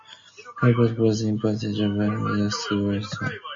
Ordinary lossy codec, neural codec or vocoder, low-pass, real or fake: MP3, 32 kbps; none; 7.2 kHz; real